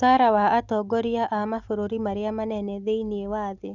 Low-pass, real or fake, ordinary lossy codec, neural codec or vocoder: 7.2 kHz; real; Opus, 64 kbps; none